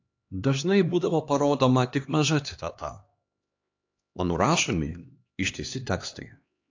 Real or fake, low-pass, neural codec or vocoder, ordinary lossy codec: fake; 7.2 kHz; codec, 16 kHz, 2 kbps, X-Codec, HuBERT features, trained on LibriSpeech; AAC, 48 kbps